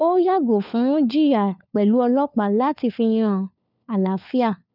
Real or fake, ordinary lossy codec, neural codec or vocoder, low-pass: fake; none; codec, 16 kHz, 4 kbps, FunCodec, trained on LibriTTS, 50 frames a second; 5.4 kHz